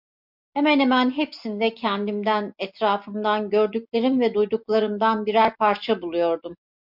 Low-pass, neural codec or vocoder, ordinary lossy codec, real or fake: 5.4 kHz; none; MP3, 48 kbps; real